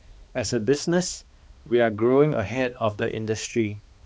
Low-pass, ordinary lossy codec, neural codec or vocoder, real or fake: none; none; codec, 16 kHz, 2 kbps, X-Codec, HuBERT features, trained on balanced general audio; fake